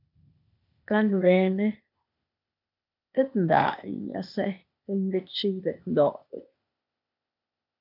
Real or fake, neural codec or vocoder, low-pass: fake; codec, 16 kHz, 0.8 kbps, ZipCodec; 5.4 kHz